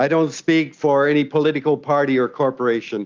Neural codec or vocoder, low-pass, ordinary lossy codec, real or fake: none; 7.2 kHz; Opus, 24 kbps; real